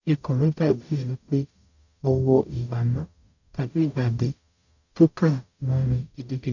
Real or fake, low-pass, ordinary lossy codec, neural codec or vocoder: fake; 7.2 kHz; none; codec, 44.1 kHz, 0.9 kbps, DAC